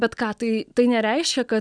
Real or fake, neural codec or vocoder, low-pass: real; none; 9.9 kHz